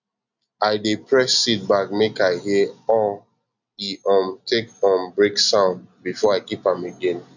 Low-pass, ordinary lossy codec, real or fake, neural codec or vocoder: 7.2 kHz; none; real; none